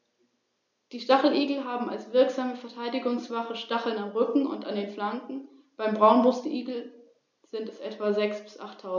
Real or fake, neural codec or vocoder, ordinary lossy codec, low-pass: real; none; none; 7.2 kHz